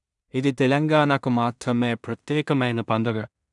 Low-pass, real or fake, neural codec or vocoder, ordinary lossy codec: 10.8 kHz; fake; codec, 16 kHz in and 24 kHz out, 0.4 kbps, LongCat-Audio-Codec, two codebook decoder; none